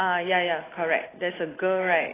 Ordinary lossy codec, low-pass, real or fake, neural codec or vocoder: AAC, 16 kbps; 3.6 kHz; real; none